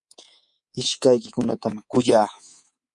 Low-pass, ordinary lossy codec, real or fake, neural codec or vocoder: 10.8 kHz; MP3, 64 kbps; fake; codec, 24 kHz, 3.1 kbps, DualCodec